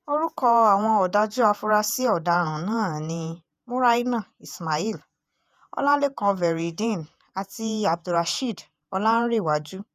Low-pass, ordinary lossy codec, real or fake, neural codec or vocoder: 14.4 kHz; none; fake; vocoder, 48 kHz, 128 mel bands, Vocos